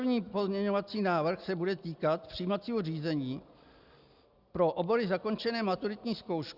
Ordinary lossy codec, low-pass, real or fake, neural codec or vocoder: Opus, 64 kbps; 5.4 kHz; real; none